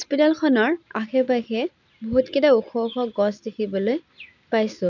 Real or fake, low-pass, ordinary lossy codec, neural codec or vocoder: real; 7.2 kHz; none; none